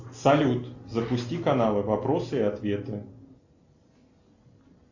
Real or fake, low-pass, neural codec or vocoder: real; 7.2 kHz; none